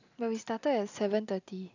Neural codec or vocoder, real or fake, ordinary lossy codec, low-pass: none; real; none; 7.2 kHz